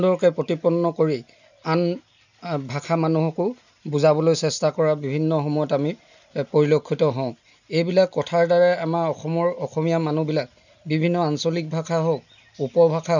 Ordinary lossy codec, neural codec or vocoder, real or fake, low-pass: none; none; real; 7.2 kHz